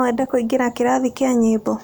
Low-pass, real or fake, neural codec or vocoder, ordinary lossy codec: none; real; none; none